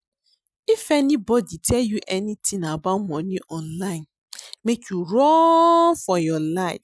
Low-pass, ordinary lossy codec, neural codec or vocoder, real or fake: none; none; none; real